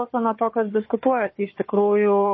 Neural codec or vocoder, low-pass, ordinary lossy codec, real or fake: codec, 16 kHz, 4 kbps, FunCodec, trained on Chinese and English, 50 frames a second; 7.2 kHz; MP3, 24 kbps; fake